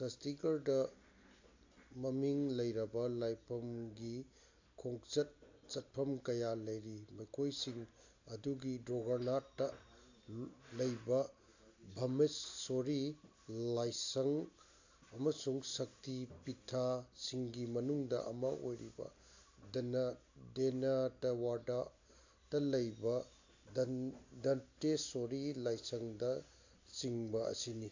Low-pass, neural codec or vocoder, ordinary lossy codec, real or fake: 7.2 kHz; none; AAC, 48 kbps; real